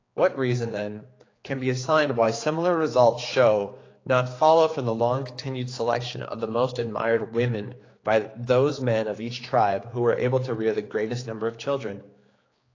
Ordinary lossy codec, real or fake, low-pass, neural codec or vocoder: AAC, 32 kbps; fake; 7.2 kHz; codec, 16 kHz, 4 kbps, X-Codec, HuBERT features, trained on general audio